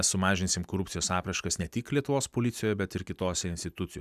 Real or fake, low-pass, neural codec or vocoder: real; 14.4 kHz; none